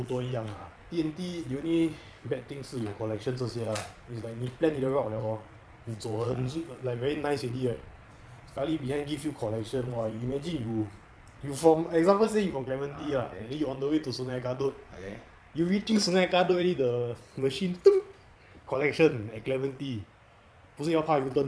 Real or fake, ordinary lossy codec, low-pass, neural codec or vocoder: fake; none; none; vocoder, 22.05 kHz, 80 mel bands, Vocos